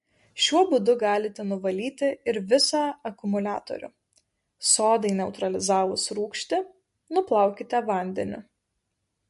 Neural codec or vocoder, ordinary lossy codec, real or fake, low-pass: none; MP3, 48 kbps; real; 14.4 kHz